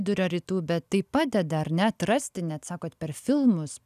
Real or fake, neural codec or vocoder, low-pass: real; none; 14.4 kHz